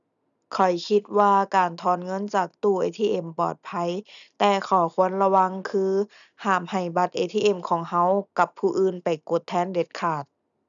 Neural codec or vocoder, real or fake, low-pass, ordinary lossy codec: none; real; 7.2 kHz; none